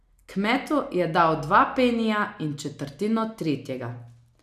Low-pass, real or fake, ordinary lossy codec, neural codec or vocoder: 14.4 kHz; real; AAC, 96 kbps; none